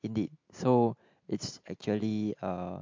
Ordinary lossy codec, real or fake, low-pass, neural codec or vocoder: MP3, 48 kbps; real; 7.2 kHz; none